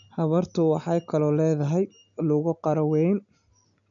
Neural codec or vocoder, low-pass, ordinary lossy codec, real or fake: none; 7.2 kHz; none; real